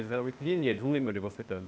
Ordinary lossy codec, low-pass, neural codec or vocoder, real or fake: none; none; codec, 16 kHz, 0.8 kbps, ZipCodec; fake